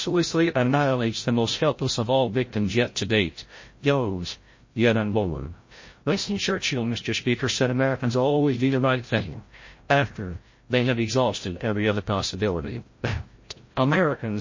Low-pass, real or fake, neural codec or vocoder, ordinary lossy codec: 7.2 kHz; fake; codec, 16 kHz, 0.5 kbps, FreqCodec, larger model; MP3, 32 kbps